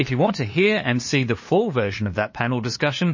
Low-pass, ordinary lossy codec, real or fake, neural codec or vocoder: 7.2 kHz; MP3, 32 kbps; fake; codec, 16 kHz, 2 kbps, FunCodec, trained on LibriTTS, 25 frames a second